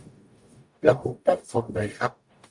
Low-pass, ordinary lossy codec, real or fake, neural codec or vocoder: 10.8 kHz; MP3, 64 kbps; fake; codec, 44.1 kHz, 0.9 kbps, DAC